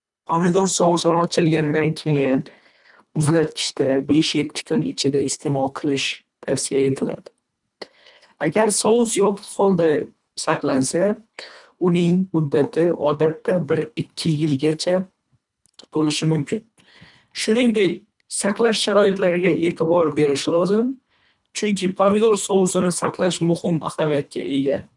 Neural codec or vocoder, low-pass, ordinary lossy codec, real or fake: codec, 24 kHz, 1.5 kbps, HILCodec; 10.8 kHz; none; fake